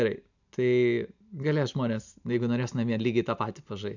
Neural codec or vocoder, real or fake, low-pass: none; real; 7.2 kHz